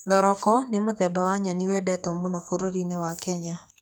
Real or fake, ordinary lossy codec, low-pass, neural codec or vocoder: fake; none; none; codec, 44.1 kHz, 2.6 kbps, SNAC